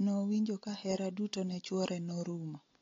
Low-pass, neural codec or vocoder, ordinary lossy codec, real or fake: 7.2 kHz; none; AAC, 32 kbps; real